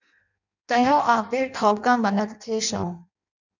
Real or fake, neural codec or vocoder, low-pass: fake; codec, 16 kHz in and 24 kHz out, 0.6 kbps, FireRedTTS-2 codec; 7.2 kHz